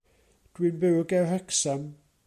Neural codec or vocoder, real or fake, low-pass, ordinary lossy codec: none; real; 14.4 kHz; MP3, 64 kbps